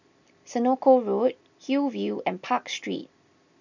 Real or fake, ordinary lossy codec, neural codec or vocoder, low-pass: real; none; none; 7.2 kHz